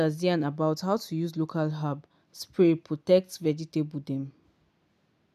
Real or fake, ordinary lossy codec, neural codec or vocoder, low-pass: real; none; none; 14.4 kHz